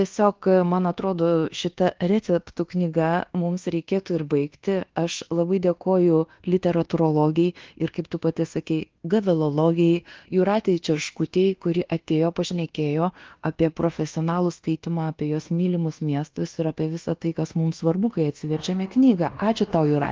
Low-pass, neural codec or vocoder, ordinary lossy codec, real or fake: 7.2 kHz; codec, 24 kHz, 1.2 kbps, DualCodec; Opus, 16 kbps; fake